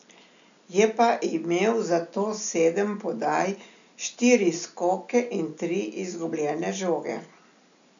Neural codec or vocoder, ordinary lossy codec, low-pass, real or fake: none; none; 7.2 kHz; real